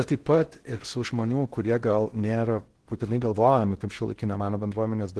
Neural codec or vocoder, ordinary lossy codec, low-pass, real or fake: codec, 16 kHz in and 24 kHz out, 0.6 kbps, FocalCodec, streaming, 2048 codes; Opus, 16 kbps; 10.8 kHz; fake